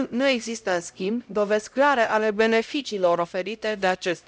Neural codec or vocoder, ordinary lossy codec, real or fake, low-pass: codec, 16 kHz, 0.5 kbps, X-Codec, HuBERT features, trained on LibriSpeech; none; fake; none